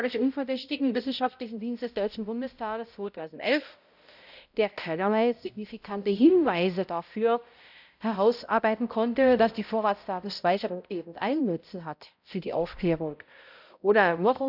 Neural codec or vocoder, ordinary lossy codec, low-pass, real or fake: codec, 16 kHz, 0.5 kbps, X-Codec, HuBERT features, trained on balanced general audio; none; 5.4 kHz; fake